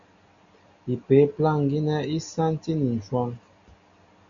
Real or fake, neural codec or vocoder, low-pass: real; none; 7.2 kHz